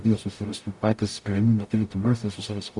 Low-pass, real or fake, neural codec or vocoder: 10.8 kHz; fake; codec, 44.1 kHz, 0.9 kbps, DAC